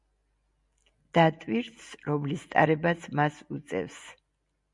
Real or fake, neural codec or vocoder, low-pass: real; none; 10.8 kHz